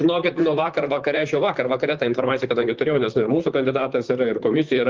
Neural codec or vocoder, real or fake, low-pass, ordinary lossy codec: vocoder, 44.1 kHz, 128 mel bands, Pupu-Vocoder; fake; 7.2 kHz; Opus, 32 kbps